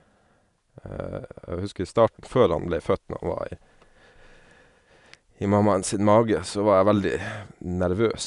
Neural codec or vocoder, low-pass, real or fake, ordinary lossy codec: none; 10.8 kHz; real; none